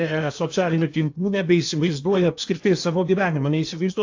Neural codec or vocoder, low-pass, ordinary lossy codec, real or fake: codec, 16 kHz in and 24 kHz out, 0.8 kbps, FocalCodec, streaming, 65536 codes; 7.2 kHz; AAC, 48 kbps; fake